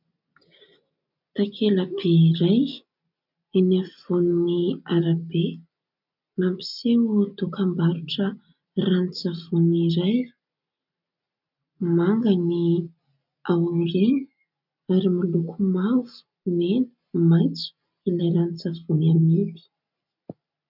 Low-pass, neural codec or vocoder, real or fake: 5.4 kHz; none; real